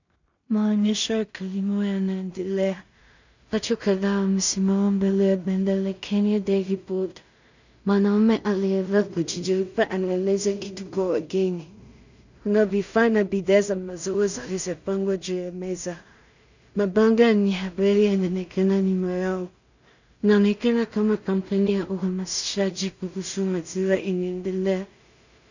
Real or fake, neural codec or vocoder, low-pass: fake; codec, 16 kHz in and 24 kHz out, 0.4 kbps, LongCat-Audio-Codec, two codebook decoder; 7.2 kHz